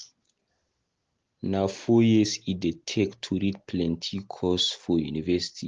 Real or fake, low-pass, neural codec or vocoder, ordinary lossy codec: real; 7.2 kHz; none; Opus, 16 kbps